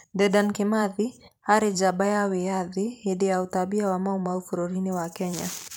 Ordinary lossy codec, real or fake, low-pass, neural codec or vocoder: none; fake; none; vocoder, 44.1 kHz, 128 mel bands every 512 samples, BigVGAN v2